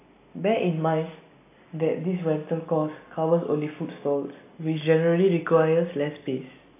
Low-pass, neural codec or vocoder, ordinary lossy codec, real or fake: 3.6 kHz; none; AAC, 24 kbps; real